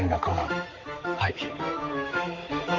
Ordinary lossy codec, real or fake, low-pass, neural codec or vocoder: Opus, 32 kbps; fake; 7.2 kHz; codec, 16 kHz, 4 kbps, X-Codec, HuBERT features, trained on balanced general audio